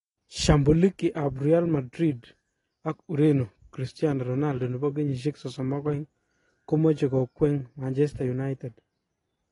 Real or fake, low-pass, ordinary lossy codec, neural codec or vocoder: real; 10.8 kHz; AAC, 32 kbps; none